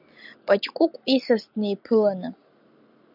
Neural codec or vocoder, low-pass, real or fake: none; 5.4 kHz; real